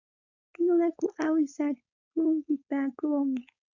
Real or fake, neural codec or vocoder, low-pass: fake; codec, 16 kHz, 4.8 kbps, FACodec; 7.2 kHz